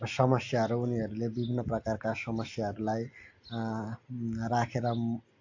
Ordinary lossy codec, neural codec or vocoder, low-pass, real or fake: AAC, 48 kbps; none; 7.2 kHz; real